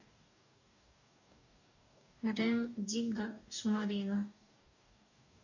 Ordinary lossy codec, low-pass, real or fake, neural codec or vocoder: none; 7.2 kHz; fake; codec, 44.1 kHz, 2.6 kbps, DAC